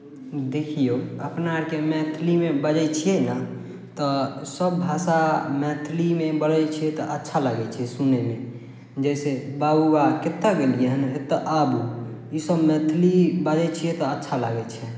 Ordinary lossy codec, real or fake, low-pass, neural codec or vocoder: none; real; none; none